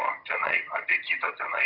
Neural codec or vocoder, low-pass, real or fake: vocoder, 22.05 kHz, 80 mel bands, HiFi-GAN; 5.4 kHz; fake